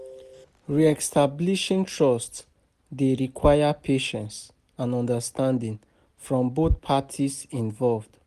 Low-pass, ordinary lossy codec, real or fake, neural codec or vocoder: 14.4 kHz; Opus, 32 kbps; real; none